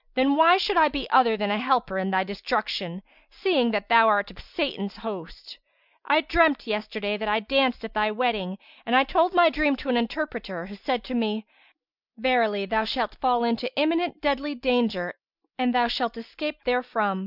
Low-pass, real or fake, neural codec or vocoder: 5.4 kHz; real; none